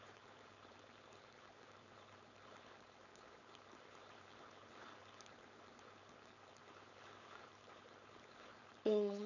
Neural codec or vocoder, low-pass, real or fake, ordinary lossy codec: codec, 16 kHz, 4.8 kbps, FACodec; 7.2 kHz; fake; none